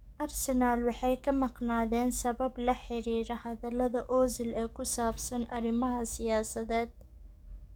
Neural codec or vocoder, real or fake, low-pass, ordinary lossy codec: codec, 44.1 kHz, 7.8 kbps, DAC; fake; 19.8 kHz; none